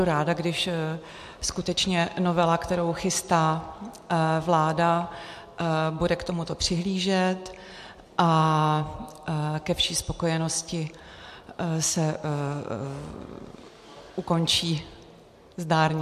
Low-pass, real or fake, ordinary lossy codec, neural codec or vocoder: 14.4 kHz; real; MP3, 64 kbps; none